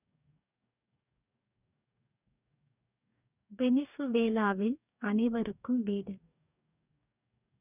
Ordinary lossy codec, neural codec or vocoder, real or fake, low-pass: none; codec, 44.1 kHz, 2.6 kbps, DAC; fake; 3.6 kHz